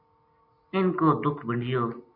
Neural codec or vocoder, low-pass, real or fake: none; 5.4 kHz; real